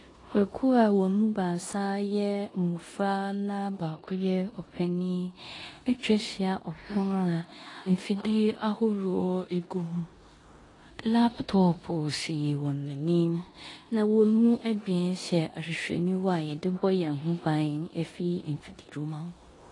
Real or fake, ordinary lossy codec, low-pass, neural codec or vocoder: fake; AAC, 32 kbps; 10.8 kHz; codec, 16 kHz in and 24 kHz out, 0.9 kbps, LongCat-Audio-Codec, four codebook decoder